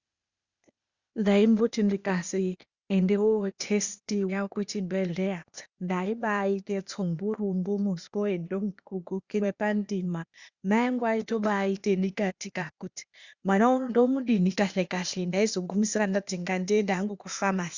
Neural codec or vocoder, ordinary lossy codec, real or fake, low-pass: codec, 16 kHz, 0.8 kbps, ZipCodec; Opus, 64 kbps; fake; 7.2 kHz